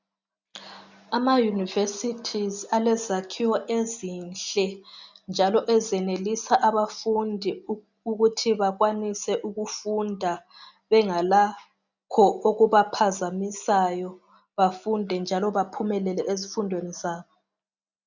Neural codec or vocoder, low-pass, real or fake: none; 7.2 kHz; real